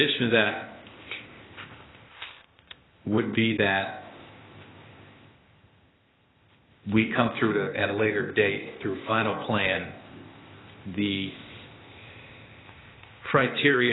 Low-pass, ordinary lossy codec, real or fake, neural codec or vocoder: 7.2 kHz; AAC, 16 kbps; fake; codec, 16 kHz, 0.8 kbps, ZipCodec